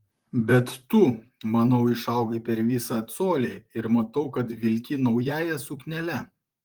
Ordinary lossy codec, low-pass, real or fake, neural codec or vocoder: Opus, 24 kbps; 19.8 kHz; fake; vocoder, 44.1 kHz, 128 mel bands, Pupu-Vocoder